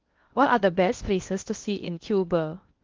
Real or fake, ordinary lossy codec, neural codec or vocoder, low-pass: fake; Opus, 32 kbps; codec, 16 kHz in and 24 kHz out, 0.6 kbps, FocalCodec, streaming, 2048 codes; 7.2 kHz